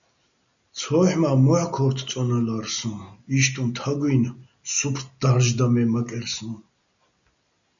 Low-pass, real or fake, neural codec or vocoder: 7.2 kHz; real; none